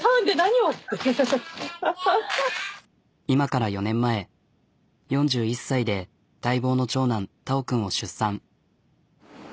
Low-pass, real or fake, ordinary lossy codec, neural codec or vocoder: none; real; none; none